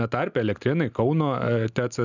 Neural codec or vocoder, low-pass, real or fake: none; 7.2 kHz; real